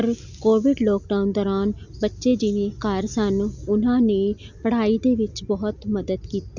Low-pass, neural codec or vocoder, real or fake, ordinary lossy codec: 7.2 kHz; none; real; none